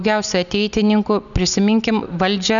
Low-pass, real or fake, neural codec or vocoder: 7.2 kHz; real; none